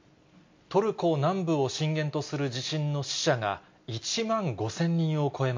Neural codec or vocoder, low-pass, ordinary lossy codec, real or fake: none; 7.2 kHz; MP3, 48 kbps; real